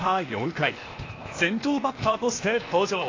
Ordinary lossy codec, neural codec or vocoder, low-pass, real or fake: AAC, 32 kbps; codec, 16 kHz, 0.8 kbps, ZipCodec; 7.2 kHz; fake